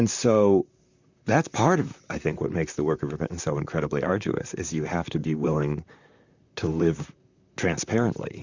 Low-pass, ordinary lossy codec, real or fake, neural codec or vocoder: 7.2 kHz; Opus, 64 kbps; fake; vocoder, 44.1 kHz, 128 mel bands, Pupu-Vocoder